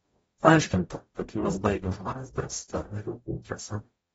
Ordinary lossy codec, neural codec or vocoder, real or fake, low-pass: AAC, 24 kbps; codec, 44.1 kHz, 0.9 kbps, DAC; fake; 19.8 kHz